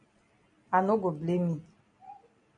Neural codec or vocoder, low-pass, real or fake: none; 9.9 kHz; real